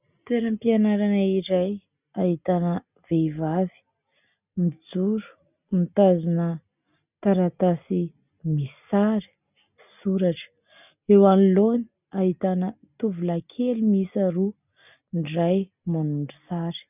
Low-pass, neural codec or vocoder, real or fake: 3.6 kHz; none; real